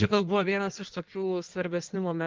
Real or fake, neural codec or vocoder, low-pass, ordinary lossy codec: fake; codec, 16 kHz in and 24 kHz out, 1.1 kbps, FireRedTTS-2 codec; 7.2 kHz; Opus, 32 kbps